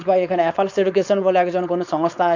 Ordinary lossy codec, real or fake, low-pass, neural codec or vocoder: MP3, 64 kbps; fake; 7.2 kHz; codec, 16 kHz, 4.8 kbps, FACodec